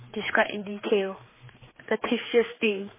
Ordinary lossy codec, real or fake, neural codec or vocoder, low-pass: MP3, 16 kbps; fake; codec, 16 kHz, 4 kbps, X-Codec, HuBERT features, trained on general audio; 3.6 kHz